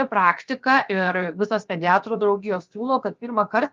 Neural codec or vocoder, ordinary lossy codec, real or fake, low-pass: codec, 16 kHz, about 1 kbps, DyCAST, with the encoder's durations; Opus, 32 kbps; fake; 7.2 kHz